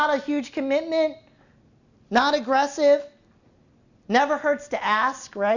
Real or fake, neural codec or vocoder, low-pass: real; none; 7.2 kHz